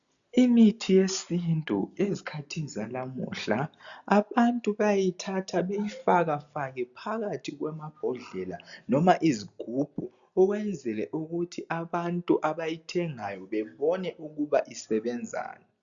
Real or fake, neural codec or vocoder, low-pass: real; none; 7.2 kHz